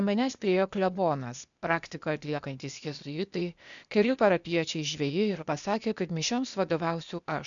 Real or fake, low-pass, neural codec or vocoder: fake; 7.2 kHz; codec, 16 kHz, 0.8 kbps, ZipCodec